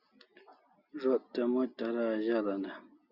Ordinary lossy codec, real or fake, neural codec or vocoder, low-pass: Opus, 64 kbps; real; none; 5.4 kHz